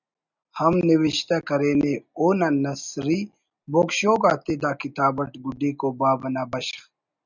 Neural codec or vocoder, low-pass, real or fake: none; 7.2 kHz; real